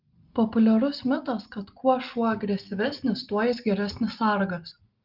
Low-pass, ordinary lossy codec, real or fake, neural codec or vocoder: 5.4 kHz; Opus, 24 kbps; real; none